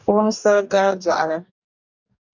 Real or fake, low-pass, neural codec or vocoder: fake; 7.2 kHz; codec, 44.1 kHz, 2.6 kbps, DAC